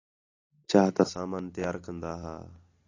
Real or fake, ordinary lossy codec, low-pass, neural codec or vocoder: real; AAC, 48 kbps; 7.2 kHz; none